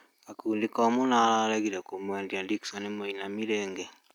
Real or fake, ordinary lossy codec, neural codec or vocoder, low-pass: real; none; none; 19.8 kHz